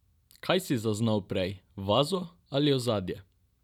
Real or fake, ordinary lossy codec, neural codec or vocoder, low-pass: real; none; none; 19.8 kHz